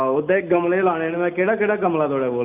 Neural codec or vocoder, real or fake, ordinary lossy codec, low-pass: none; real; none; 3.6 kHz